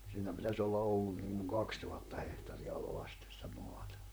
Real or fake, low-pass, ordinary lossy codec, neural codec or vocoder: fake; none; none; vocoder, 44.1 kHz, 128 mel bands, Pupu-Vocoder